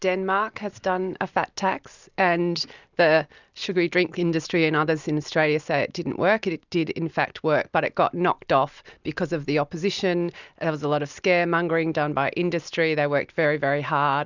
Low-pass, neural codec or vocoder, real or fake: 7.2 kHz; none; real